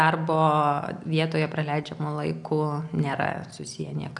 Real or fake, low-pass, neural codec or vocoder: real; 10.8 kHz; none